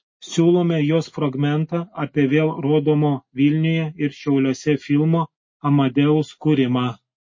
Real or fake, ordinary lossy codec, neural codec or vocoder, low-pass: real; MP3, 32 kbps; none; 7.2 kHz